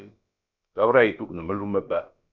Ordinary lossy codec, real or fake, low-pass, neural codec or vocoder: MP3, 64 kbps; fake; 7.2 kHz; codec, 16 kHz, about 1 kbps, DyCAST, with the encoder's durations